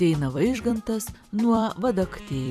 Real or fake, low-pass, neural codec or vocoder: fake; 14.4 kHz; vocoder, 44.1 kHz, 128 mel bands every 256 samples, BigVGAN v2